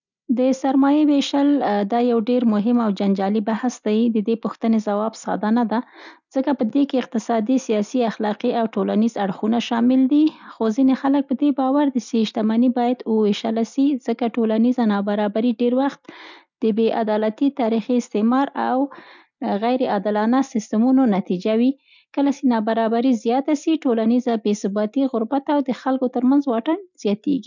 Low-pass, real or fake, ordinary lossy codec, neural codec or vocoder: 7.2 kHz; real; none; none